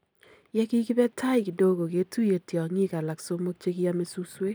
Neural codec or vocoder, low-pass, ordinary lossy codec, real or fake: none; none; none; real